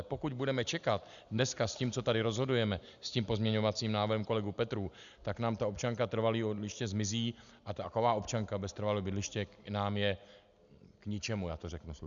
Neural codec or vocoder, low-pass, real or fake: none; 7.2 kHz; real